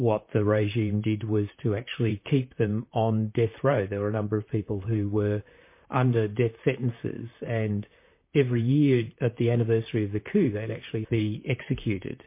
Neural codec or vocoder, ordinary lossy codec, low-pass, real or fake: vocoder, 44.1 kHz, 128 mel bands, Pupu-Vocoder; MP3, 24 kbps; 3.6 kHz; fake